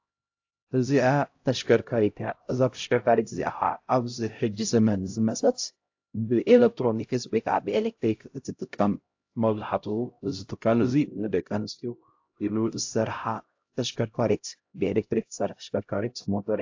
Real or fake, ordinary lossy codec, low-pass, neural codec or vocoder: fake; AAC, 48 kbps; 7.2 kHz; codec, 16 kHz, 0.5 kbps, X-Codec, HuBERT features, trained on LibriSpeech